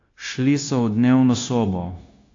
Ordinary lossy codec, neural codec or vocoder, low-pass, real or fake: AAC, 48 kbps; codec, 16 kHz, 0.9 kbps, LongCat-Audio-Codec; 7.2 kHz; fake